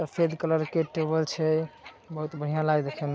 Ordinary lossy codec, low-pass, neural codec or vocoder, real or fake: none; none; none; real